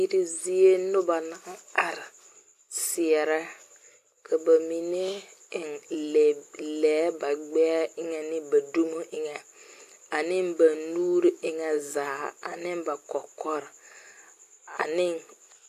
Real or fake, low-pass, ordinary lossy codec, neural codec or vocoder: real; 14.4 kHz; AAC, 64 kbps; none